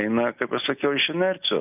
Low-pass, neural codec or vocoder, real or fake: 3.6 kHz; none; real